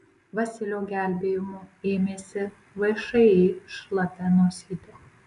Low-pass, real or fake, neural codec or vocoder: 10.8 kHz; real; none